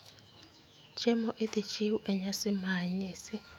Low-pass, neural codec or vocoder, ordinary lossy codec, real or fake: 19.8 kHz; autoencoder, 48 kHz, 128 numbers a frame, DAC-VAE, trained on Japanese speech; none; fake